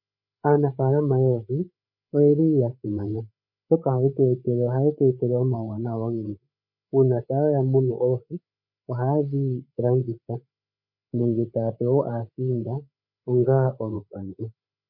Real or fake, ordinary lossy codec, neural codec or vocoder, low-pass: fake; MP3, 32 kbps; codec, 16 kHz, 8 kbps, FreqCodec, larger model; 5.4 kHz